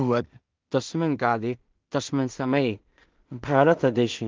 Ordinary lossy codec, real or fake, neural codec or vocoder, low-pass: Opus, 32 kbps; fake; codec, 16 kHz in and 24 kHz out, 0.4 kbps, LongCat-Audio-Codec, two codebook decoder; 7.2 kHz